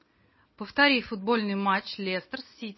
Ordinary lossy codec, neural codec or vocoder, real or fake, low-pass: MP3, 24 kbps; none; real; 7.2 kHz